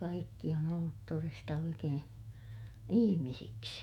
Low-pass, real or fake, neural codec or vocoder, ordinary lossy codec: 19.8 kHz; fake; autoencoder, 48 kHz, 128 numbers a frame, DAC-VAE, trained on Japanese speech; none